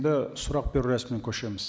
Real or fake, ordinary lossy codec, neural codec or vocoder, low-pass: real; none; none; none